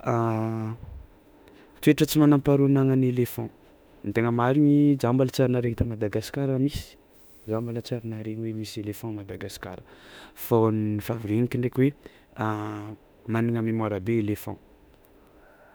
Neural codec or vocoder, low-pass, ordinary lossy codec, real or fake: autoencoder, 48 kHz, 32 numbers a frame, DAC-VAE, trained on Japanese speech; none; none; fake